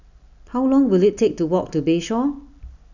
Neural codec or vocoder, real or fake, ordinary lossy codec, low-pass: none; real; none; 7.2 kHz